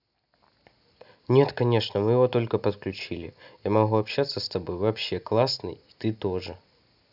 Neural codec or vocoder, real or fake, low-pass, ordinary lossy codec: none; real; 5.4 kHz; none